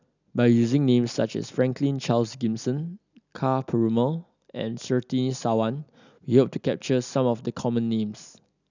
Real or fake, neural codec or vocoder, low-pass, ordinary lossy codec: real; none; 7.2 kHz; none